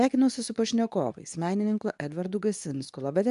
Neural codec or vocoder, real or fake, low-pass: codec, 24 kHz, 0.9 kbps, WavTokenizer, medium speech release version 2; fake; 10.8 kHz